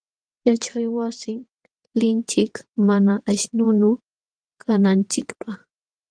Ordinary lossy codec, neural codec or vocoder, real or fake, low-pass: Opus, 24 kbps; vocoder, 22.05 kHz, 80 mel bands, WaveNeXt; fake; 9.9 kHz